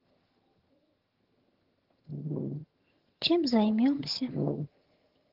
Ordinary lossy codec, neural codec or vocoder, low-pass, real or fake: Opus, 16 kbps; vocoder, 22.05 kHz, 80 mel bands, HiFi-GAN; 5.4 kHz; fake